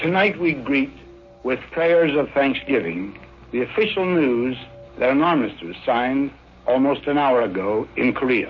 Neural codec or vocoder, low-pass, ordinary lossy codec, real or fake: none; 7.2 kHz; MP3, 32 kbps; real